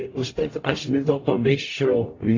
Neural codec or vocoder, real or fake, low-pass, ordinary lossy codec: codec, 44.1 kHz, 0.9 kbps, DAC; fake; 7.2 kHz; AAC, 32 kbps